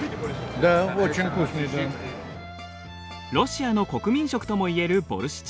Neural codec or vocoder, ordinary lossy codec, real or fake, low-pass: none; none; real; none